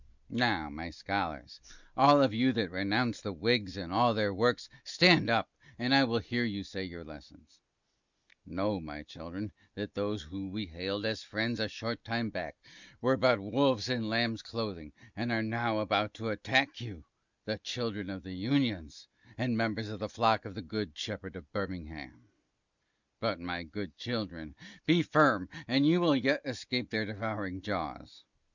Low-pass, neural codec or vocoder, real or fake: 7.2 kHz; none; real